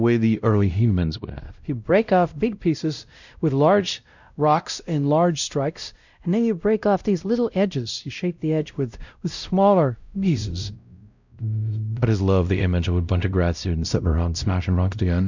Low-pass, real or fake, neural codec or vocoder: 7.2 kHz; fake; codec, 16 kHz, 0.5 kbps, X-Codec, WavLM features, trained on Multilingual LibriSpeech